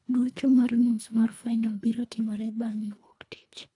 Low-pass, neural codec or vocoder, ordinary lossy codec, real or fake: 10.8 kHz; codec, 24 kHz, 1.5 kbps, HILCodec; none; fake